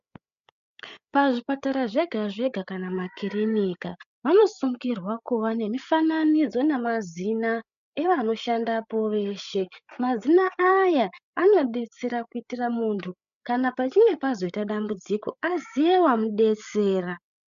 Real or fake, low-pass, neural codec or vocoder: fake; 7.2 kHz; codec, 16 kHz, 8 kbps, FreqCodec, larger model